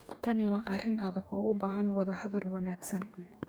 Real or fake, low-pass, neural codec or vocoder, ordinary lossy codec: fake; none; codec, 44.1 kHz, 2.6 kbps, DAC; none